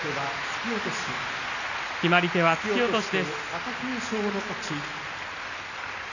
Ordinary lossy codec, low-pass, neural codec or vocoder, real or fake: none; 7.2 kHz; none; real